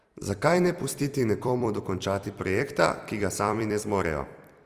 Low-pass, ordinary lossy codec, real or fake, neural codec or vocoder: 14.4 kHz; Opus, 32 kbps; fake; vocoder, 44.1 kHz, 128 mel bands every 256 samples, BigVGAN v2